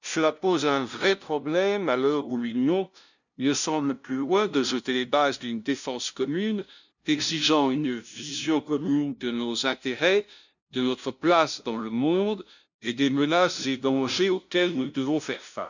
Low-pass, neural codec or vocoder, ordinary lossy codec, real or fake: 7.2 kHz; codec, 16 kHz, 0.5 kbps, FunCodec, trained on LibriTTS, 25 frames a second; none; fake